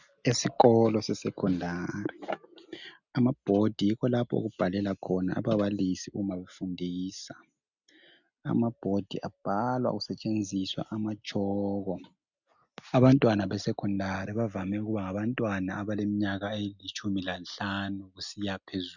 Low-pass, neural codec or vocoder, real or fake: 7.2 kHz; none; real